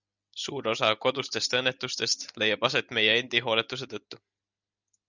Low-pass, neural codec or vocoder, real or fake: 7.2 kHz; none; real